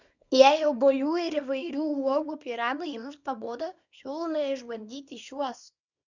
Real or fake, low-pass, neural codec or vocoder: fake; 7.2 kHz; codec, 24 kHz, 0.9 kbps, WavTokenizer, small release